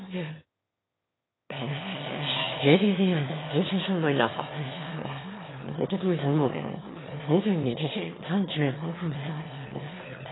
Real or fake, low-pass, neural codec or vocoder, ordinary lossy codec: fake; 7.2 kHz; autoencoder, 22.05 kHz, a latent of 192 numbers a frame, VITS, trained on one speaker; AAC, 16 kbps